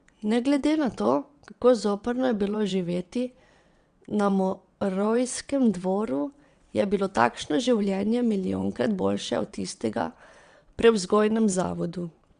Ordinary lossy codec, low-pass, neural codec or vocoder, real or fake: Opus, 64 kbps; 9.9 kHz; vocoder, 22.05 kHz, 80 mel bands, WaveNeXt; fake